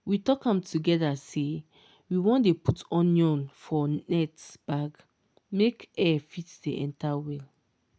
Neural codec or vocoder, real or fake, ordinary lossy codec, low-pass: none; real; none; none